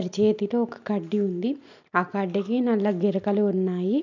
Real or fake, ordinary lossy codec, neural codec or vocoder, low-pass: real; none; none; 7.2 kHz